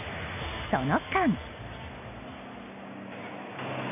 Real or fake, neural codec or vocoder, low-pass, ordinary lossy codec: real; none; 3.6 kHz; none